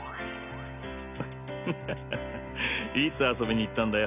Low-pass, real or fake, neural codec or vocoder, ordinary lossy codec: 3.6 kHz; real; none; none